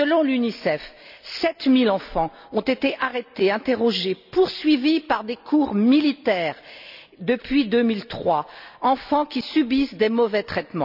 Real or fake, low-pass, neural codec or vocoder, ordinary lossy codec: real; 5.4 kHz; none; none